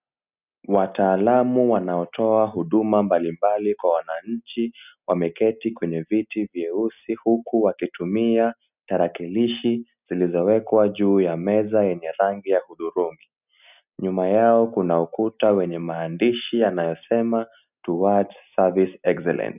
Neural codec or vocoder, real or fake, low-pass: none; real; 3.6 kHz